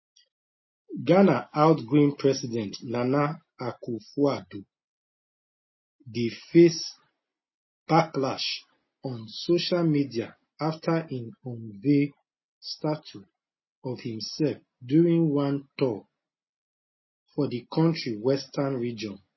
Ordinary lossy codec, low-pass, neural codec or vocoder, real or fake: MP3, 24 kbps; 7.2 kHz; none; real